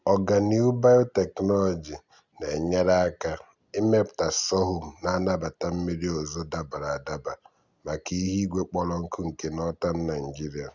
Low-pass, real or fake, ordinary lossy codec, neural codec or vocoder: 7.2 kHz; real; Opus, 64 kbps; none